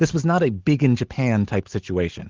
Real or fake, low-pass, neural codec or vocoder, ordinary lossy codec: real; 7.2 kHz; none; Opus, 16 kbps